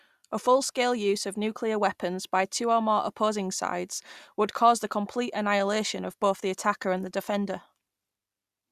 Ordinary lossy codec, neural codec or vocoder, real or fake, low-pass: Opus, 64 kbps; none; real; 14.4 kHz